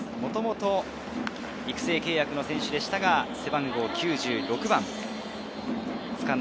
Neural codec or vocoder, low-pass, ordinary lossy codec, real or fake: none; none; none; real